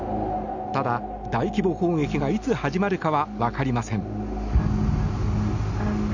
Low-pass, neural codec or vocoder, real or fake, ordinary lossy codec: 7.2 kHz; none; real; none